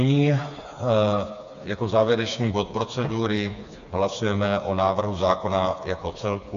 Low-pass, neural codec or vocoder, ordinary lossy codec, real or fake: 7.2 kHz; codec, 16 kHz, 4 kbps, FreqCodec, smaller model; AAC, 96 kbps; fake